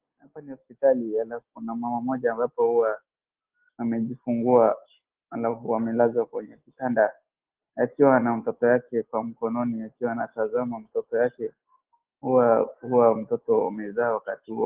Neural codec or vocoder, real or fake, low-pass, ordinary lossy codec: none; real; 3.6 kHz; Opus, 16 kbps